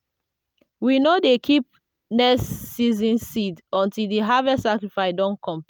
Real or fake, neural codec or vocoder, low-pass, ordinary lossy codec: real; none; none; none